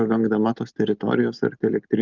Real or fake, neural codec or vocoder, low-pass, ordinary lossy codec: real; none; 7.2 kHz; Opus, 32 kbps